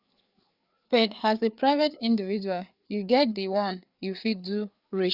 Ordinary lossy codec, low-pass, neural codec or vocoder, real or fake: Opus, 64 kbps; 5.4 kHz; codec, 16 kHz, 4 kbps, FreqCodec, larger model; fake